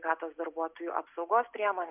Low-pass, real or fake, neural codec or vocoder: 3.6 kHz; real; none